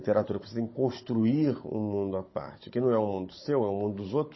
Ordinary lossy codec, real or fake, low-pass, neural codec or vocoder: MP3, 24 kbps; fake; 7.2 kHz; codec, 16 kHz, 16 kbps, FunCodec, trained on Chinese and English, 50 frames a second